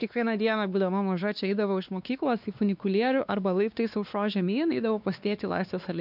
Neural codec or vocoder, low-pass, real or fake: codec, 16 kHz, 2 kbps, X-Codec, WavLM features, trained on Multilingual LibriSpeech; 5.4 kHz; fake